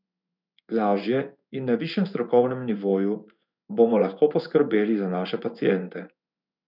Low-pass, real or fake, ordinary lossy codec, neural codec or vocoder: 5.4 kHz; fake; none; codec, 16 kHz in and 24 kHz out, 1 kbps, XY-Tokenizer